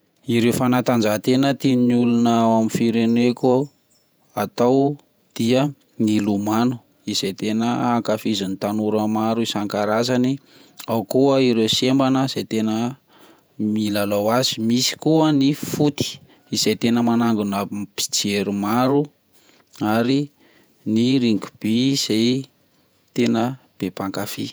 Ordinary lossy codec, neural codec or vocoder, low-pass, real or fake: none; vocoder, 48 kHz, 128 mel bands, Vocos; none; fake